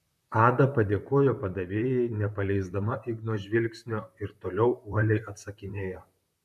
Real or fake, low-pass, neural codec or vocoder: fake; 14.4 kHz; vocoder, 44.1 kHz, 128 mel bands, Pupu-Vocoder